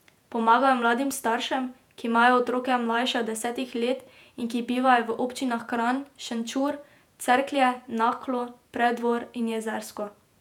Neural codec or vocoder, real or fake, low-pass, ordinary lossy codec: vocoder, 48 kHz, 128 mel bands, Vocos; fake; 19.8 kHz; none